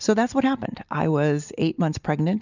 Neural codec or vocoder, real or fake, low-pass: none; real; 7.2 kHz